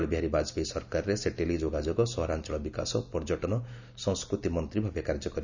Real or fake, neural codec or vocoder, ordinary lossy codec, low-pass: real; none; none; 7.2 kHz